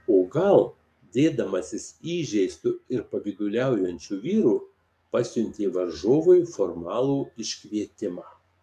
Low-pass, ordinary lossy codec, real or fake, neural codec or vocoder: 14.4 kHz; MP3, 96 kbps; fake; codec, 44.1 kHz, 7.8 kbps, DAC